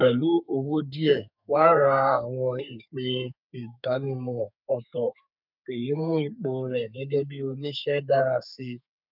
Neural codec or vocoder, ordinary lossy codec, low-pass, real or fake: codec, 32 kHz, 1.9 kbps, SNAC; none; 5.4 kHz; fake